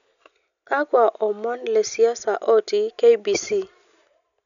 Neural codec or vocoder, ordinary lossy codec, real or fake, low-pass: none; none; real; 7.2 kHz